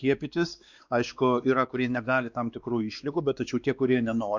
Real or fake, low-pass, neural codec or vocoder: fake; 7.2 kHz; codec, 16 kHz, 2 kbps, X-Codec, WavLM features, trained on Multilingual LibriSpeech